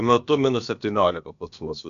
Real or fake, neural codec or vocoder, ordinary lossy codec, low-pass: fake; codec, 16 kHz, about 1 kbps, DyCAST, with the encoder's durations; MP3, 96 kbps; 7.2 kHz